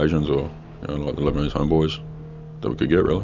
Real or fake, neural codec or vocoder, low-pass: real; none; 7.2 kHz